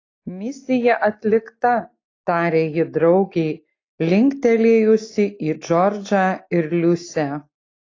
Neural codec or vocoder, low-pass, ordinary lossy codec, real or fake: none; 7.2 kHz; AAC, 32 kbps; real